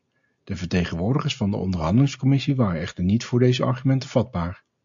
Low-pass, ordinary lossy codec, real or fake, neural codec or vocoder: 7.2 kHz; AAC, 64 kbps; real; none